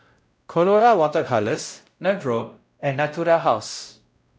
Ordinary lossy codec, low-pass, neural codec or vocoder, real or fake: none; none; codec, 16 kHz, 0.5 kbps, X-Codec, WavLM features, trained on Multilingual LibriSpeech; fake